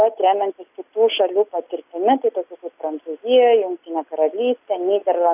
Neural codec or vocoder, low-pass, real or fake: none; 3.6 kHz; real